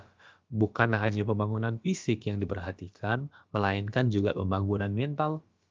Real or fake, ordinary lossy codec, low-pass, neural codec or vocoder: fake; Opus, 24 kbps; 7.2 kHz; codec, 16 kHz, about 1 kbps, DyCAST, with the encoder's durations